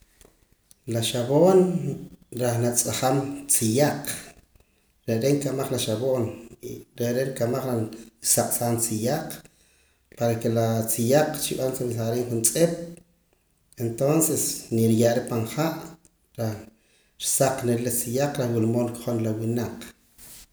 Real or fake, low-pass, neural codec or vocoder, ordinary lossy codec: real; none; none; none